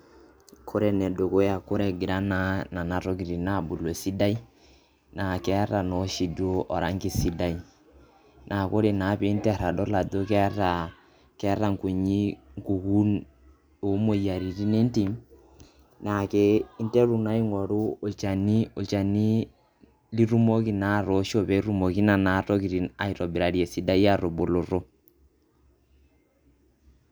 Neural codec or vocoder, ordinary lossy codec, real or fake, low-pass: none; none; real; none